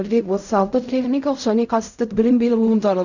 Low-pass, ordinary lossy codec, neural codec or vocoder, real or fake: 7.2 kHz; none; codec, 16 kHz in and 24 kHz out, 0.4 kbps, LongCat-Audio-Codec, fine tuned four codebook decoder; fake